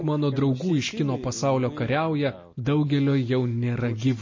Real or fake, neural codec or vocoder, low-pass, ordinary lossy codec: real; none; 7.2 kHz; MP3, 32 kbps